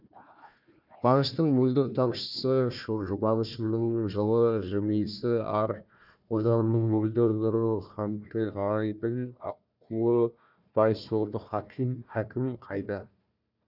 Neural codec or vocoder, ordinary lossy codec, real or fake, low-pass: codec, 16 kHz, 1 kbps, FunCodec, trained on Chinese and English, 50 frames a second; none; fake; 5.4 kHz